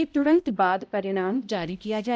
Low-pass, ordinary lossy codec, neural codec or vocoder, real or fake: none; none; codec, 16 kHz, 0.5 kbps, X-Codec, HuBERT features, trained on balanced general audio; fake